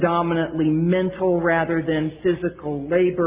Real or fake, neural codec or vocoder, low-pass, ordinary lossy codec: real; none; 3.6 kHz; Opus, 32 kbps